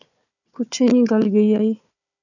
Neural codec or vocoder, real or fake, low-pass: codec, 16 kHz, 4 kbps, FunCodec, trained on Chinese and English, 50 frames a second; fake; 7.2 kHz